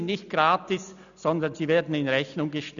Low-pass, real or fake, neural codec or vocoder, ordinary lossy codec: 7.2 kHz; real; none; none